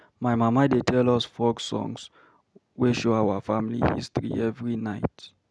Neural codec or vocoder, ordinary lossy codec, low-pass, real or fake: none; none; none; real